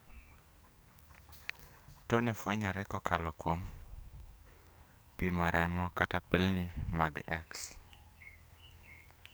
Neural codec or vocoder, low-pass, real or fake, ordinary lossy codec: codec, 44.1 kHz, 2.6 kbps, SNAC; none; fake; none